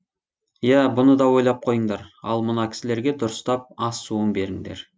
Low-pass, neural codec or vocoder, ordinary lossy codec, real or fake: none; none; none; real